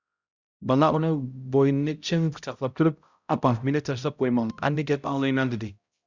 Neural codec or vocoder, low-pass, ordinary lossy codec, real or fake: codec, 16 kHz, 0.5 kbps, X-Codec, HuBERT features, trained on balanced general audio; 7.2 kHz; Opus, 64 kbps; fake